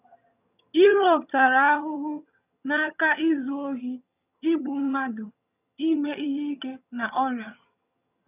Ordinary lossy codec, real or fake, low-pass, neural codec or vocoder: none; fake; 3.6 kHz; vocoder, 22.05 kHz, 80 mel bands, HiFi-GAN